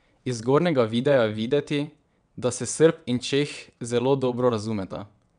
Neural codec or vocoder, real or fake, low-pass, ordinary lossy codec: vocoder, 22.05 kHz, 80 mel bands, WaveNeXt; fake; 9.9 kHz; none